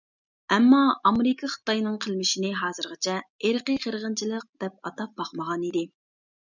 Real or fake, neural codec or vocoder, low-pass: real; none; 7.2 kHz